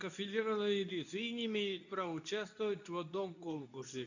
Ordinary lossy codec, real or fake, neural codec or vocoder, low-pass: none; fake; codec, 24 kHz, 0.9 kbps, WavTokenizer, medium speech release version 2; 7.2 kHz